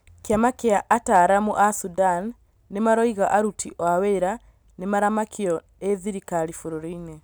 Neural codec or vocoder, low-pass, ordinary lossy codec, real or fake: none; none; none; real